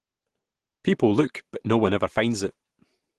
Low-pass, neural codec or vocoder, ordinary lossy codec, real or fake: 10.8 kHz; none; Opus, 16 kbps; real